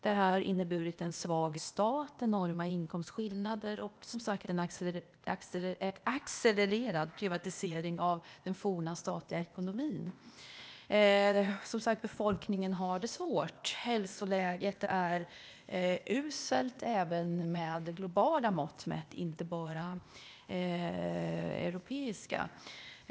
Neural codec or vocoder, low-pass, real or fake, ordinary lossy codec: codec, 16 kHz, 0.8 kbps, ZipCodec; none; fake; none